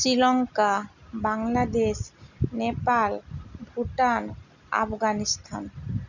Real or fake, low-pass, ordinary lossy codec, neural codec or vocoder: real; 7.2 kHz; none; none